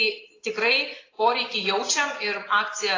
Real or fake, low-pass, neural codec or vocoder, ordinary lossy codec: real; 7.2 kHz; none; AAC, 32 kbps